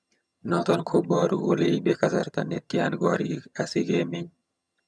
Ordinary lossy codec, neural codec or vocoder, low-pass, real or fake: none; vocoder, 22.05 kHz, 80 mel bands, HiFi-GAN; none; fake